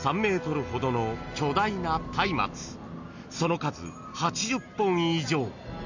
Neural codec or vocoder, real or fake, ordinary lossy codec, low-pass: none; real; none; 7.2 kHz